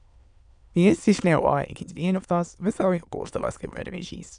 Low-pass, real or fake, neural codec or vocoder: 9.9 kHz; fake; autoencoder, 22.05 kHz, a latent of 192 numbers a frame, VITS, trained on many speakers